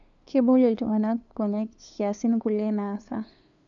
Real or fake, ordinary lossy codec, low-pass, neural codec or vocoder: fake; none; 7.2 kHz; codec, 16 kHz, 2 kbps, FunCodec, trained on LibriTTS, 25 frames a second